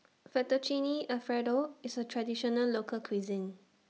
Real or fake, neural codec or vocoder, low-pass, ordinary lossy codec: real; none; none; none